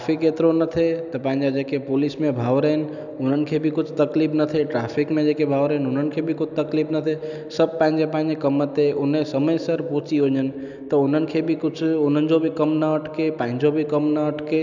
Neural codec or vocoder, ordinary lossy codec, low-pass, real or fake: none; none; 7.2 kHz; real